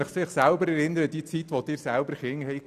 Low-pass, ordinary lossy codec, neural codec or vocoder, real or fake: 14.4 kHz; none; none; real